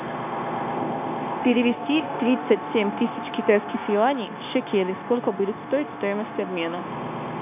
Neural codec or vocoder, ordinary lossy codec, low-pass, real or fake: codec, 16 kHz, 0.9 kbps, LongCat-Audio-Codec; AAC, 32 kbps; 3.6 kHz; fake